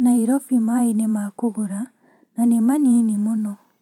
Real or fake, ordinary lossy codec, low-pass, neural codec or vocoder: fake; MP3, 96 kbps; 19.8 kHz; vocoder, 44.1 kHz, 128 mel bands every 512 samples, BigVGAN v2